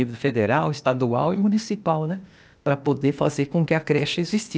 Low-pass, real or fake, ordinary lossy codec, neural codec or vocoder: none; fake; none; codec, 16 kHz, 0.8 kbps, ZipCodec